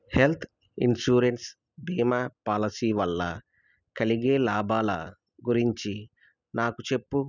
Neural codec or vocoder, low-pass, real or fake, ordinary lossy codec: none; 7.2 kHz; real; none